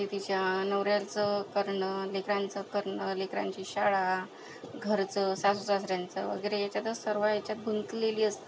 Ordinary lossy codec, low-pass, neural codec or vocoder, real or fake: none; none; none; real